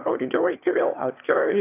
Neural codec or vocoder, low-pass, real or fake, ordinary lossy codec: autoencoder, 22.05 kHz, a latent of 192 numbers a frame, VITS, trained on one speaker; 3.6 kHz; fake; AAC, 24 kbps